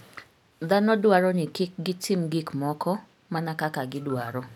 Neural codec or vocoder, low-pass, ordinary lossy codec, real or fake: none; 19.8 kHz; none; real